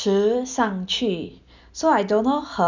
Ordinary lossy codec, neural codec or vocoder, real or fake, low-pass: none; none; real; 7.2 kHz